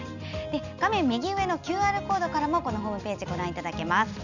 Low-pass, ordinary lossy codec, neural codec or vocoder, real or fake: 7.2 kHz; none; none; real